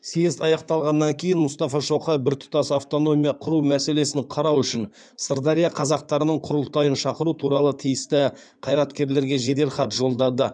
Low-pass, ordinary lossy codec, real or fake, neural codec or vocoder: 9.9 kHz; none; fake; codec, 16 kHz in and 24 kHz out, 2.2 kbps, FireRedTTS-2 codec